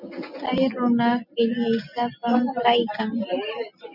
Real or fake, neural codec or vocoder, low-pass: real; none; 5.4 kHz